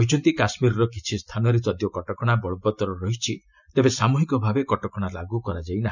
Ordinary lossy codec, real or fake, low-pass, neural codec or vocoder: none; real; 7.2 kHz; none